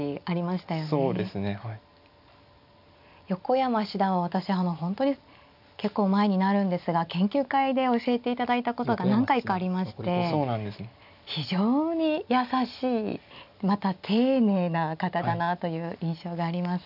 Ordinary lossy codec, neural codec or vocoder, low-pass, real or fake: none; none; 5.4 kHz; real